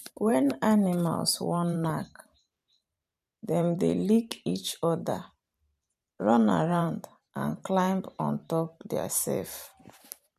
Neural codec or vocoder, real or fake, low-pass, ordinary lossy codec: vocoder, 44.1 kHz, 128 mel bands every 512 samples, BigVGAN v2; fake; 14.4 kHz; none